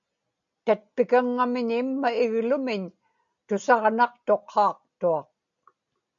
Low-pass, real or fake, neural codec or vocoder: 7.2 kHz; real; none